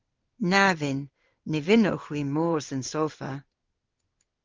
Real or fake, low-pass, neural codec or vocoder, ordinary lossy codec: fake; 7.2 kHz; codec, 16 kHz in and 24 kHz out, 1 kbps, XY-Tokenizer; Opus, 32 kbps